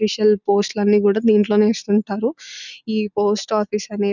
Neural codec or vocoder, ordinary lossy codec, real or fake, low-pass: none; none; real; 7.2 kHz